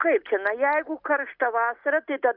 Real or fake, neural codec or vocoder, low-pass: real; none; 5.4 kHz